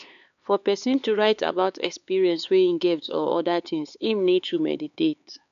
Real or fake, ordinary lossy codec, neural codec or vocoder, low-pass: fake; none; codec, 16 kHz, 4 kbps, X-Codec, HuBERT features, trained on LibriSpeech; 7.2 kHz